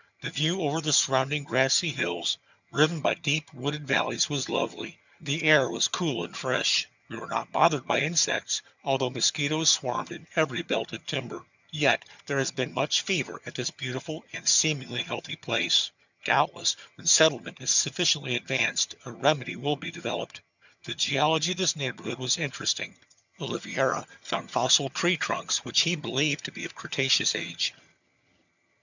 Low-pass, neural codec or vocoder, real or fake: 7.2 kHz; vocoder, 22.05 kHz, 80 mel bands, HiFi-GAN; fake